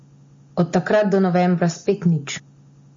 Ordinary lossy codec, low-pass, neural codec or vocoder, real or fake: MP3, 32 kbps; 7.2 kHz; none; real